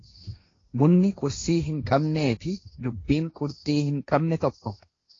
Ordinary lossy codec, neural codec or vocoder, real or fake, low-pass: AAC, 32 kbps; codec, 16 kHz, 1.1 kbps, Voila-Tokenizer; fake; 7.2 kHz